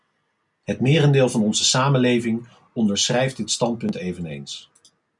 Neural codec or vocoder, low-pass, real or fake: none; 10.8 kHz; real